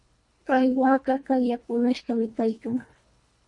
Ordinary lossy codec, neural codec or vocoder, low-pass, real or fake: MP3, 48 kbps; codec, 24 kHz, 1.5 kbps, HILCodec; 10.8 kHz; fake